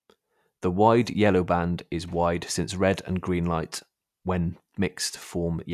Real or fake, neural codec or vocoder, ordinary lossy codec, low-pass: real; none; none; 14.4 kHz